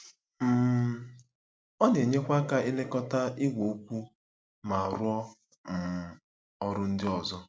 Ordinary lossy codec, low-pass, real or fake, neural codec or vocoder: none; none; real; none